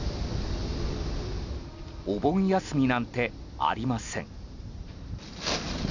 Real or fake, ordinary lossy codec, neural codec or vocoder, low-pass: real; none; none; 7.2 kHz